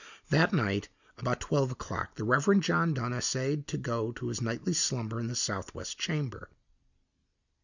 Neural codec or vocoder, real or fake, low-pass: none; real; 7.2 kHz